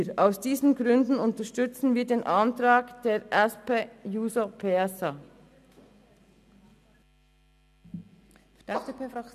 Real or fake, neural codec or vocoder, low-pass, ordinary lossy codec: real; none; 14.4 kHz; none